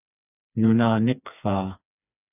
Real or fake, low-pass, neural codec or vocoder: fake; 3.6 kHz; codec, 16 kHz, 4 kbps, FreqCodec, smaller model